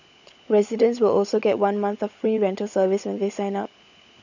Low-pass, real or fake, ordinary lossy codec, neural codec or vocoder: 7.2 kHz; fake; none; codec, 16 kHz, 16 kbps, FunCodec, trained on LibriTTS, 50 frames a second